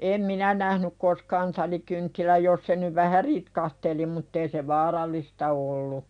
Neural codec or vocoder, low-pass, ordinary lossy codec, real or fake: none; 9.9 kHz; none; real